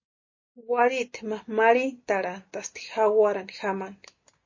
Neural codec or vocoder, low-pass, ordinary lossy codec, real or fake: none; 7.2 kHz; MP3, 32 kbps; real